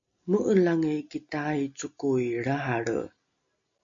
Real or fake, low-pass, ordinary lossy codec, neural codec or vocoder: real; 7.2 kHz; MP3, 48 kbps; none